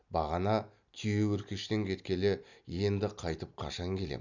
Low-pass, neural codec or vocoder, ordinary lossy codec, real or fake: 7.2 kHz; none; none; real